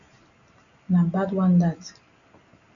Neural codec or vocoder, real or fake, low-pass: none; real; 7.2 kHz